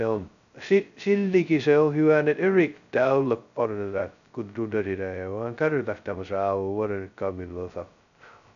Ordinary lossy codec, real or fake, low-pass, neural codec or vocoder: none; fake; 7.2 kHz; codec, 16 kHz, 0.2 kbps, FocalCodec